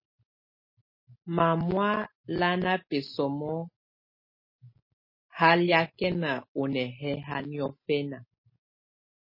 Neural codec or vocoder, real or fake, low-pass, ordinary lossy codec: none; real; 5.4 kHz; MP3, 24 kbps